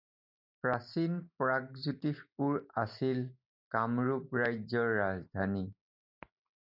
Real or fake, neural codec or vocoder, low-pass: real; none; 5.4 kHz